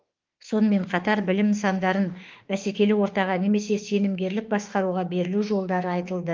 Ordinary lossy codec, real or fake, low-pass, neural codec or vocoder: Opus, 32 kbps; fake; 7.2 kHz; autoencoder, 48 kHz, 32 numbers a frame, DAC-VAE, trained on Japanese speech